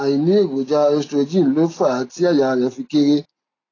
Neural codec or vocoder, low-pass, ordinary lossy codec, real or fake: none; 7.2 kHz; AAC, 32 kbps; real